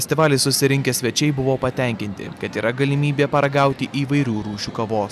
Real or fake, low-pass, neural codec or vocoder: real; 14.4 kHz; none